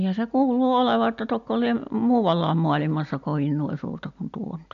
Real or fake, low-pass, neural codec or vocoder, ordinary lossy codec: real; 7.2 kHz; none; AAC, 64 kbps